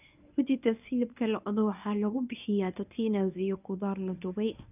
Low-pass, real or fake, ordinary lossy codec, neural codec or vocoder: 3.6 kHz; fake; none; codec, 24 kHz, 0.9 kbps, WavTokenizer, medium speech release version 1